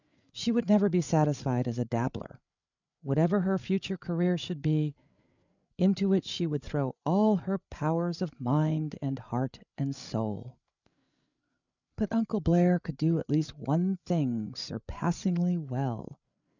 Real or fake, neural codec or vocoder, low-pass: fake; codec, 16 kHz, 8 kbps, FreqCodec, larger model; 7.2 kHz